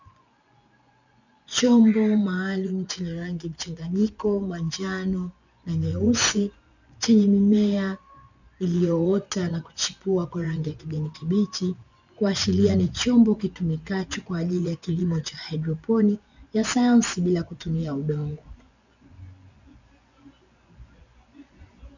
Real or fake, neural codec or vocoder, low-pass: fake; vocoder, 24 kHz, 100 mel bands, Vocos; 7.2 kHz